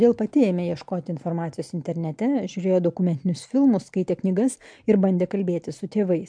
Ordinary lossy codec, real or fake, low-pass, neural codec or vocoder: MP3, 64 kbps; real; 9.9 kHz; none